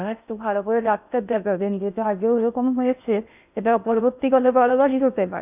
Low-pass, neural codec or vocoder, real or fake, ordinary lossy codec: 3.6 kHz; codec, 16 kHz in and 24 kHz out, 0.6 kbps, FocalCodec, streaming, 4096 codes; fake; none